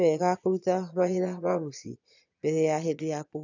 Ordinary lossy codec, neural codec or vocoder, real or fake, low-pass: none; vocoder, 22.05 kHz, 80 mel bands, Vocos; fake; 7.2 kHz